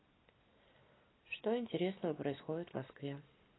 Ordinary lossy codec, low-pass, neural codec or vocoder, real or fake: AAC, 16 kbps; 7.2 kHz; none; real